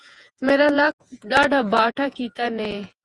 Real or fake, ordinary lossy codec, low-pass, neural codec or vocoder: fake; Opus, 32 kbps; 10.8 kHz; vocoder, 48 kHz, 128 mel bands, Vocos